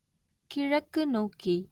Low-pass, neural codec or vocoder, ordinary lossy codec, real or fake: 19.8 kHz; none; Opus, 16 kbps; real